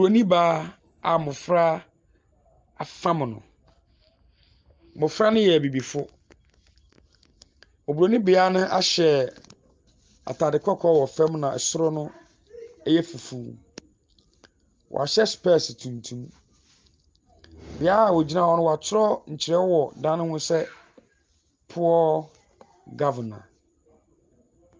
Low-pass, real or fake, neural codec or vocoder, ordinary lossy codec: 7.2 kHz; real; none; Opus, 24 kbps